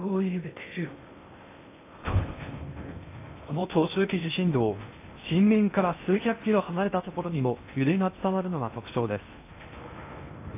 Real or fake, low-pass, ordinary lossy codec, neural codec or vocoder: fake; 3.6 kHz; AAC, 24 kbps; codec, 16 kHz in and 24 kHz out, 0.6 kbps, FocalCodec, streaming, 2048 codes